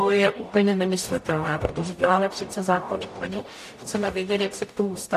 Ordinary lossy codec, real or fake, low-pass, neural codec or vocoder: AAC, 64 kbps; fake; 14.4 kHz; codec, 44.1 kHz, 0.9 kbps, DAC